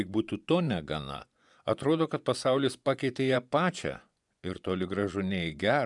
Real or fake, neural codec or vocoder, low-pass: fake; vocoder, 24 kHz, 100 mel bands, Vocos; 10.8 kHz